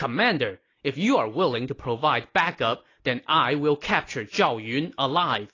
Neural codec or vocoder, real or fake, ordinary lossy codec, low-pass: none; real; AAC, 32 kbps; 7.2 kHz